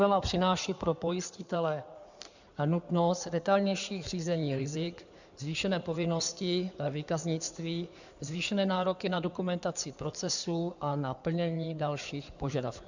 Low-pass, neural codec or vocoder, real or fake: 7.2 kHz; codec, 16 kHz in and 24 kHz out, 2.2 kbps, FireRedTTS-2 codec; fake